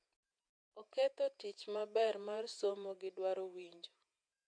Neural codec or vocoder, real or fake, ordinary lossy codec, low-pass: vocoder, 48 kHz, 128 mel bands, Vocos; fake; MP3, 64 kbps; 9.9 kHz